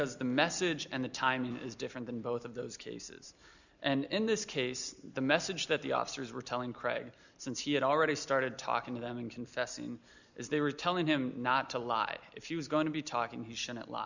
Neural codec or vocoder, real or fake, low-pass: none; real; 7.2 kHz